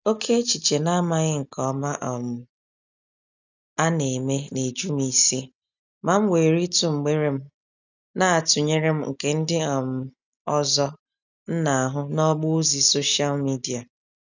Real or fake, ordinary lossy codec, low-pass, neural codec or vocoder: real; none; 7.2 kHz; none